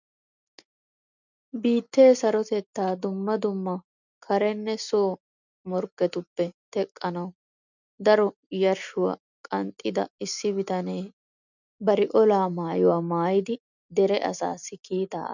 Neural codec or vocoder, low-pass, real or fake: none; 7.2 kHz; real